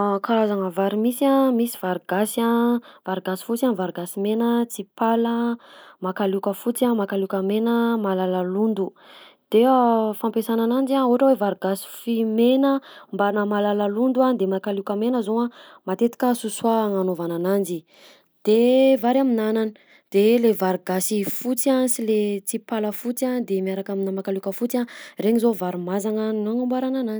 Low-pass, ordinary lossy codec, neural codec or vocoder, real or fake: none; none; none; real